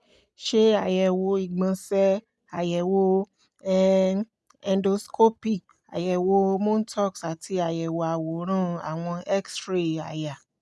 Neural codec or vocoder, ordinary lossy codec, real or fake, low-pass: none; none; real; none